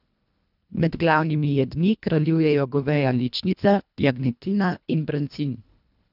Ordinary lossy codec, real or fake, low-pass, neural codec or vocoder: none; fake; 5.4 kHz; codec, 24 kHz, 1.5 kbps, HILCodec